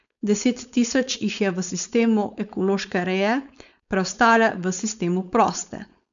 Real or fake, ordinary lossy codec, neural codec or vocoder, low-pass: fake; none; codec, 16 kHz, 4.8 kbps, FACodec; 7.2 kHz